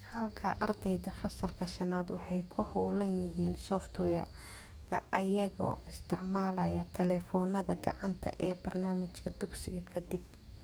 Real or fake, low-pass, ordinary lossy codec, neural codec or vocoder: fake; none; none; codec, 44.1 kHz, 2.6 kbps, DAC